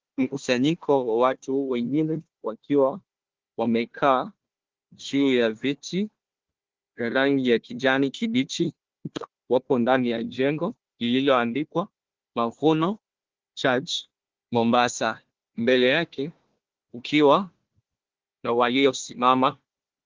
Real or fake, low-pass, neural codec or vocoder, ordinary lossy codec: fake; 7.2 kHz; codec, 16 kHz, 1 kbps, FunCodec, trained on Chinese and English, 50 frames a second; Opus, 16 kbps